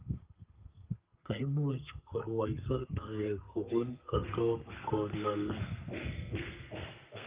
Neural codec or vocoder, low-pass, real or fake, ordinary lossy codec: codec, 32 kHz, 1.9 kbps, SNAC; 3.6 kHz; fake; Opus, 32 kbps